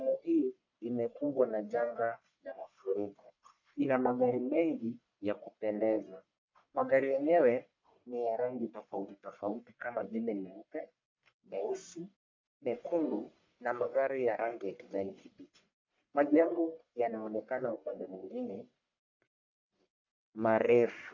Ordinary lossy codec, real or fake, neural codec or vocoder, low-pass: MP3, 48 kbps; fake; codec, 44.1 kHz, 1.7 kbps, Pupu-Codec; 7.2 kHz